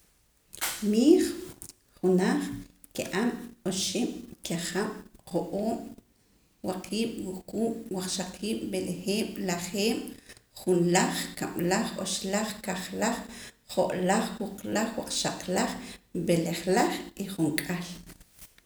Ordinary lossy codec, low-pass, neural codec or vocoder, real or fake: none; none; none; real